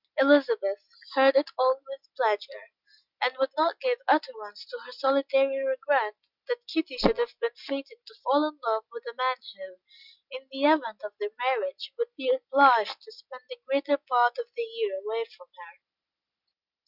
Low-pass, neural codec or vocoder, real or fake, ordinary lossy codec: 5.4 kHz; none; real; Opus, 64 kbps